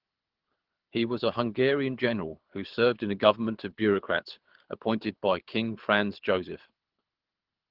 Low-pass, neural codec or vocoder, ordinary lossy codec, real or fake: 5.4 kHz; codec, 24 kHz, 6 kbps, HILCodec; Opus, 16 kbps; fake